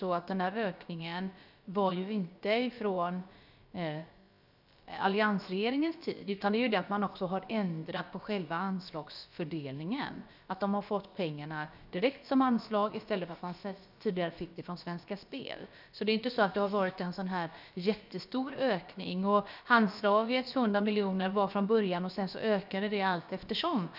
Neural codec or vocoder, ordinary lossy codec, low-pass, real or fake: codec, 16 kHz, about 1 kbps, DyCAST, with the encoder's durations; none; 5.4 kHz; fake